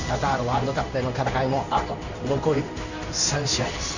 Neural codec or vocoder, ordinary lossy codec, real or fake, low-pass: codec, 16 kHz in and 24 kHz out, 1 kbps, XY-Tokenizer; none; fake; 7.2 kHz